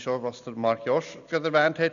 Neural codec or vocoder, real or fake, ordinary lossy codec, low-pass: none; real; none; 7.2 kHz